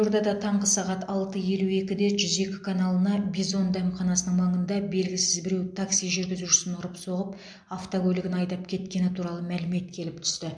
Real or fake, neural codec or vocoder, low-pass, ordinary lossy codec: real; none; 9.9 kHz; MP3, 64 kbps